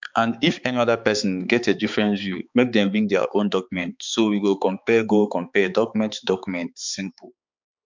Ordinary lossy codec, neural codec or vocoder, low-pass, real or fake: MP3, 64 kbps; codec, 16 kHz, 4 kbps, X-Codec, HuBERT features, trained on balanced general audio; 7.2 kHz; fake